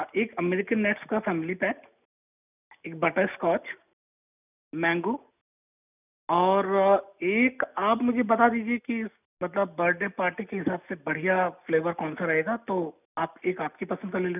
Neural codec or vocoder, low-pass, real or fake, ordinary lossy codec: none; 3.6 kHz; real; none